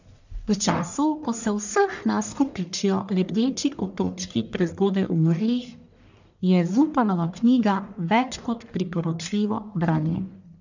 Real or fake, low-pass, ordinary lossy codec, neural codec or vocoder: fake; 7.2 kHz; none; codec, 44.1 kHz, 1.7 kbps, Pupu-Codec